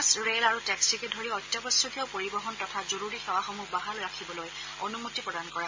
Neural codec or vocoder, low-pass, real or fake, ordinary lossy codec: none; 7.2 kHz; real; MP3, 32 kbps